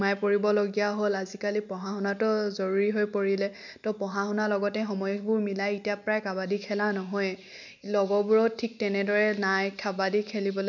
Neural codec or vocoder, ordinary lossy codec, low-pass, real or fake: none; none; 7.2 kHz; real